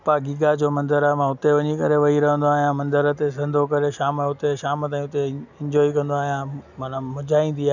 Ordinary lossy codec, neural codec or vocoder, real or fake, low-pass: none; none; real; 7.2 kHz